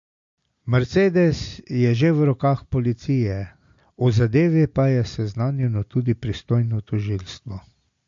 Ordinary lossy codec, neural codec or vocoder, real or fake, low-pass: MP3, 48 kbps; none; real; 7.2 kHz